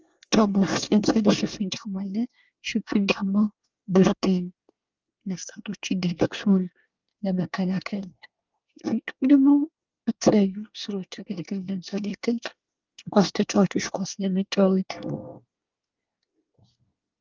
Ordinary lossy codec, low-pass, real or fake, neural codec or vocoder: Opus, 24 kbps; 7.2 kHz; fake; codec, 24 kHz, 1 kbps, SNAC